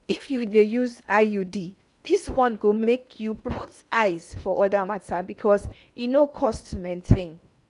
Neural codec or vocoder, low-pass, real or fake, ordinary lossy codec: codec, 16 kHz in and 24 kHz out, 0.8 kbps, FocalCodec, streaming, 65536 codes; 10.8 kHz; fake; none